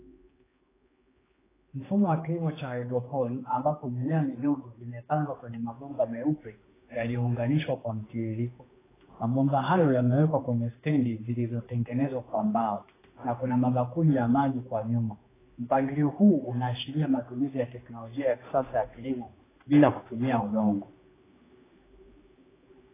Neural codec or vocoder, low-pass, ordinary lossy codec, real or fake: codec, 16 kHz, 2 kbps, X-Codec, HuBERT features, trained on general audio; 3.6 kHz; AAC, 16 kbps; fake